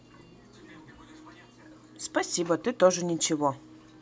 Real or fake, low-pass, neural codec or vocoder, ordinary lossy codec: real; none; none; none